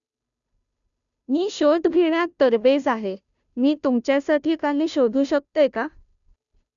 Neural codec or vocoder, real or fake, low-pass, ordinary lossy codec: codec, 16 kHz, 0.5 kbps, FunCodec, trained on Chinese and English, 25 frames a second; fake; 7.2 kHz; none